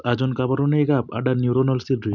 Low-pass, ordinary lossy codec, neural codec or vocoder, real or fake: 7.2 kHz; none; none; real